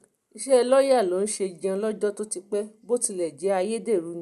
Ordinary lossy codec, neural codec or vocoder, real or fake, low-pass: none; none; real; 14.4 kHz